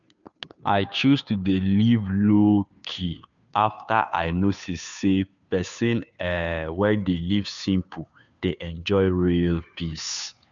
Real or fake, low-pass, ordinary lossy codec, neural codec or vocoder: fake; 7.2 kHz; none; codec, 16 kHz, 2 kbps, FunCodec, trained on Chinese and English, 25 frames a second